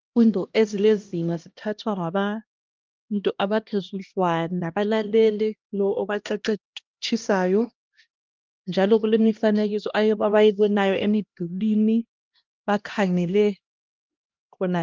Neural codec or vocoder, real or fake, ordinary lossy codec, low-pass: codec, 16 kHz, 1 kbps, X-Codec, HuBERT features, trained on LibriSpeech; fake; Opus, 32 kbps; 7.2 kHz